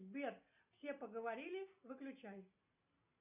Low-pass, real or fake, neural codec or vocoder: 3.6 kHz; real; none